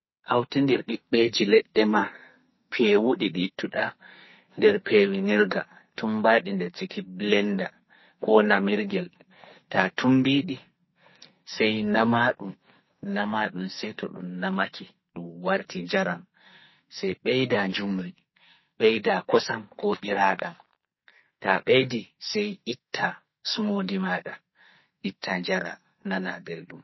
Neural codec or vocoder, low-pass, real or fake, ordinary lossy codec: codec, 44.1 kHz, 2.6 kbps, SNAC; 7.2 kHz; fake; MP3, 24 kbps